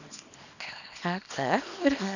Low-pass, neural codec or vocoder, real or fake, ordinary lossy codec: 7.2 kHz; codec, 24 kHz, 0.9 kbps, WavTokenizer, small release; fake; none